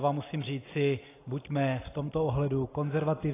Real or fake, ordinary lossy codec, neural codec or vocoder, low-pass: real; AAC, 16 kbps; none; 3.6 kHz